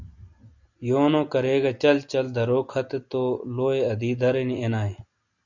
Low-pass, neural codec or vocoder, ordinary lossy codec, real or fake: 7.2 kHz; none; Opus, 64 kbps; real